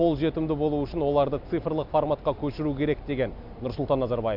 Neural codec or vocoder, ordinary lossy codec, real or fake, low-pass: none; none; real; 5.4 kHz